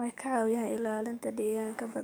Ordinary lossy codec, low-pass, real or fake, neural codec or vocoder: none; none; fake; codec, 44.1 kHz, 7.8 kbps, DAC